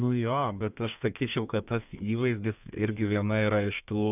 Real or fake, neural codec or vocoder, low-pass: fake; codec, 32 kHz, 1.9 kbps, SNAC; 3.6 kHz